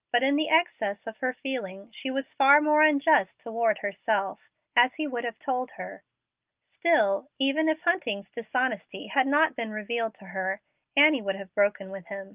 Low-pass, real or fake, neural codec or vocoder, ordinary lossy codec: 3.6 kHz; real; none; Opus, 24 kbps